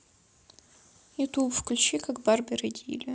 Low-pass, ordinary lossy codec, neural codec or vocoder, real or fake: none; none; none; real